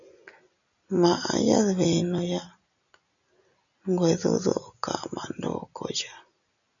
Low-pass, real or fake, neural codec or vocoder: 7.2 kHz; real; none